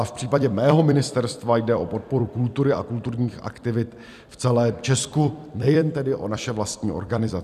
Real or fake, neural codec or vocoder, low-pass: fake; vocoder, 44.1 kHz, 128 mel bands every 256 samples, BigVGAN v2; 14.4 kHz